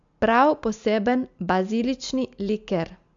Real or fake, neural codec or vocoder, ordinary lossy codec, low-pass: real; none; MP3, 64 kbps; 7.2 kHz